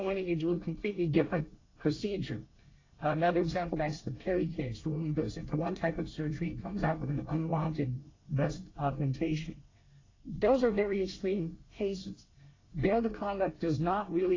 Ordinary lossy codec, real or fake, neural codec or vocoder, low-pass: AAC, 32 kbps; fake; codec, 24 kHz, 1 kbps, SNAC; 7.2 kHz